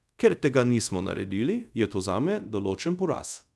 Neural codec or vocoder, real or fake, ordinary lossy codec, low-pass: codec, 24 kHz, 0.5 kbps, DualCodec; fake; none; none